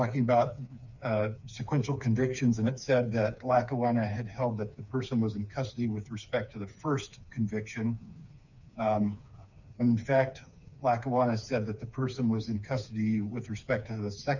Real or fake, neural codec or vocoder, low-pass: fake; codec, 16 kHz, 4 kbps, FreqCodec, smaller model; 7.2 kHz